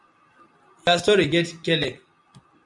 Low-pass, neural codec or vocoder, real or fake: 10.8 kHz; none; real